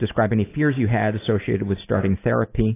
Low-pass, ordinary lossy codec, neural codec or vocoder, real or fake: 3.6 kHz; AAC, 24 kbps; none; real